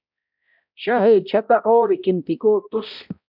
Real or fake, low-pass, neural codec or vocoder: fake; 5.4 kHz; codec, 16 kHz, 0.5 kbps, X-Codec, HuBERT features, trained on balanced general audio